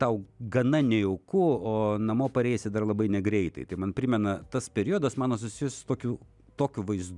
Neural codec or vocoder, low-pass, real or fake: none; 10.8 kHz; real